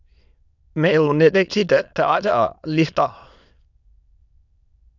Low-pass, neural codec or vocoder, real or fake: 7.2 kHz; autoencoder, 22.05 kHz, a latent of 192 numbers a frame, VITS, trained on many speakers; fake